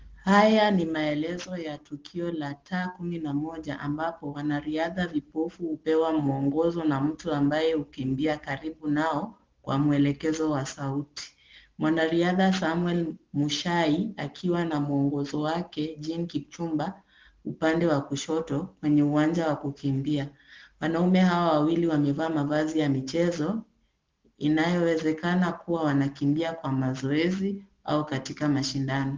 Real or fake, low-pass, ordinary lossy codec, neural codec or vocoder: real; 7.2 kHz; Opus, 16 kbps; none